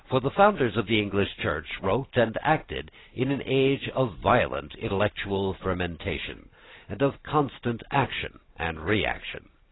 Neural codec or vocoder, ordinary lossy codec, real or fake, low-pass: none; AAC, 16 kbps; real; 7.2 kHz